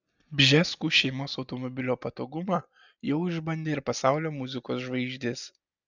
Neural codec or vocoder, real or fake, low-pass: none; real; 7.2 kHz